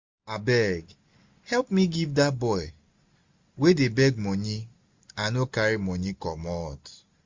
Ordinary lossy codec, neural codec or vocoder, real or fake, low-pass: MP3, 48 kbps; none; real; 7.2 kHz